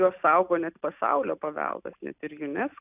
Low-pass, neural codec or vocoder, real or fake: 3.6 kHz; none; real